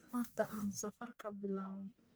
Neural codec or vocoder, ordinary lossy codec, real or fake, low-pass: codec, 44.1 kHz, 1.7 kbps, Pupu-Codec; none; fake; none